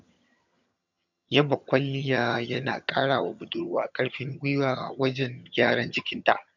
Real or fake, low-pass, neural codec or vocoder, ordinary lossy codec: fake; 7.2 kHz; vocoder, 22.05 kHz, 80 mel bands, HiFi-GAN; none